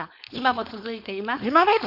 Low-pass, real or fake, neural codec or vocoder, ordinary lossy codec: 5.4 kHz; fake; codec, 16 kHz, 4.8 kbps, FACodec; none